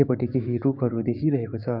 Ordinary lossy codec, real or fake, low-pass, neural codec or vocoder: none; fake; 5.4 kHz; codec, 16 kHz, 6 kbps, DAC